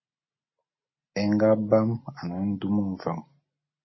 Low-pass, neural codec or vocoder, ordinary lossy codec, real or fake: 7.2 kHz; none; MP3, 24 kbps; real